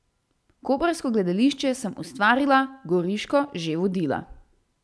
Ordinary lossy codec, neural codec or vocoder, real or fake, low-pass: none; none; real; none